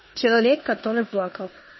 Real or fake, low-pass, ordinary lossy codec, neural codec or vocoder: fake; 7.2 kHz; MP3, 24 kbps; codec, 16 kHz in and 24 kHz out, 0.9 kbps, LongCat-Audio-Codec, four codebook decoder